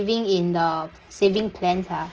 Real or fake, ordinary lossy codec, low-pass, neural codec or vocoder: real; Opus, 16 kbps; 7.2 kHz; none